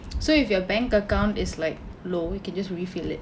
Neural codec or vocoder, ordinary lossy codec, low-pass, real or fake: none; none; none; real